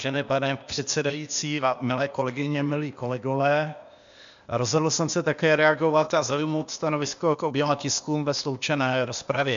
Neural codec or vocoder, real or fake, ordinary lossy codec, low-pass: codec, 16 kHz, 0.8 kbps, ZipCodec; fake; MP3, 64 kbps; 7.2 kHz